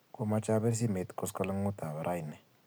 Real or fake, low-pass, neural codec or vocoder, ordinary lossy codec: real; none; none; none